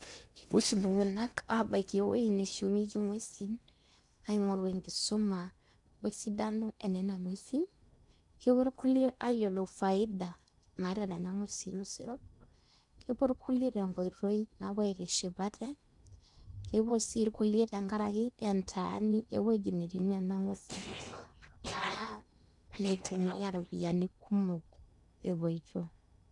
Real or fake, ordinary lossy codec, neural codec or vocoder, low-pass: fake; none; codec, 16 kHz in and 24 kHz out, 0.8 kbps, FocalCodec, streaming, 65536 codes; 10.8 kHz